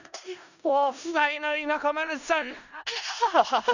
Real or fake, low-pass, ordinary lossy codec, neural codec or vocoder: fake; 7.2 kHz; none; codec, 16 kHz in and 24 kHz out, 0.4 kbps, LongCat-Audio-Codec, four codebook decoder